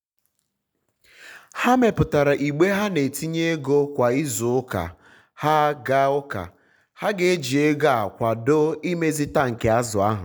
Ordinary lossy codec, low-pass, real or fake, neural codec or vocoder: none; none; real; none